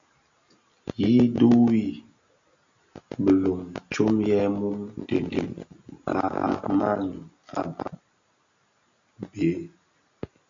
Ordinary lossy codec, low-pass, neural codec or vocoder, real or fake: AAC, 64 kbps; 7.2 kHz; none; real